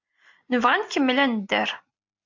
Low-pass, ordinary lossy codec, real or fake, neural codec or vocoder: 7.2 kHz; MP3, 48 kbps; real; none